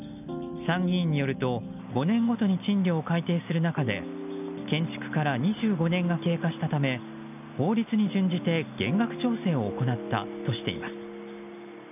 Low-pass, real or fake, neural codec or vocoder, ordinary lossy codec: 3.6 kHz; real; none; none